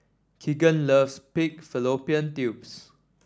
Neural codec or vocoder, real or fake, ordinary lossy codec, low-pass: none; real; none; none